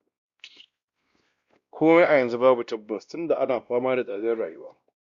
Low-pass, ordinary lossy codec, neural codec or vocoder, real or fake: 7.2 kHz; Opus, 64 kbps; codec, 16 kHz, 1 kbps, X-Codec, WavLM features, trained on Multilingual LibriSpeech; fake